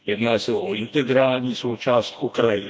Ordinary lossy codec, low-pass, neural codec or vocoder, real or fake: none; none; codec, 16 kHz, 1 kbps, FreqCodec, smaller model; fake